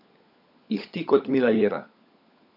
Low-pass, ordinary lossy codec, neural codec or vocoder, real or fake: 5.4 kHz; none; codec, 16 kHz, 16 kbps, FunCodec, trained on LibriTTS, 50 frames a second; fake